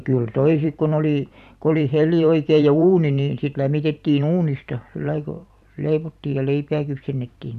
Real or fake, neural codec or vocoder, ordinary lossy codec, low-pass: fake; vocoder, 44.1 kHz, 128 mel bands every 512 samples, BigVGAN v2; none; 14.4 kHz